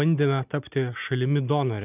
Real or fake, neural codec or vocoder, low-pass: real; none; 3.6 kHz